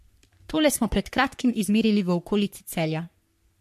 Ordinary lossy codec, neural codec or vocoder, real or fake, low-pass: MP3, 64 kbps; codec, 44.1 kHz, 3.4 kbps, Pupu-Codec; fake; 14.4 kHz